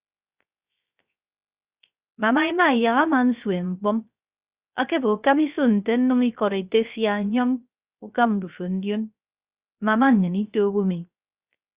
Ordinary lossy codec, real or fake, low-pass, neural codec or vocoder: Opus, 64 kbps; fake; 3.6 kHz; codec, 16 kHz, 0.3 kbps, FocalCodec